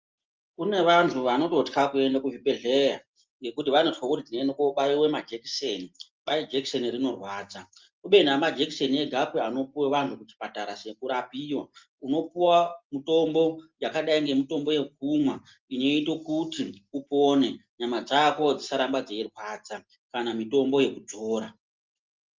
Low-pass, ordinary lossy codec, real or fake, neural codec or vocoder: 7.2 kHz; Opus, 24 kbps; real; none